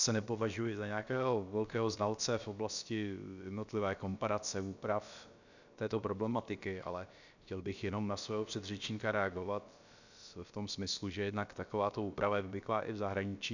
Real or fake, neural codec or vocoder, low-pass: fake; codec, 16 kHz, about 1 kbps, DyCAST, with the encoder's durations; 7.2 kHz